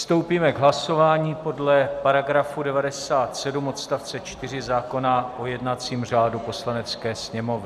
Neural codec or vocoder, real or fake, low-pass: none; real; 14.4 kHz